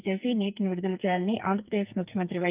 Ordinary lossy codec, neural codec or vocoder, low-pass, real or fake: Opus, 32 kbps; codec, 44.1 kHz, 2.6 kbps, SNAC; 3.6 kHz; fake